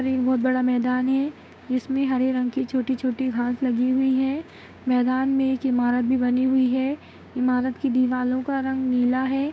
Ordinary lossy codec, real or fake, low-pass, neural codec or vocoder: none; fake; none; codec, 16 kHz, 6 kbps, DAC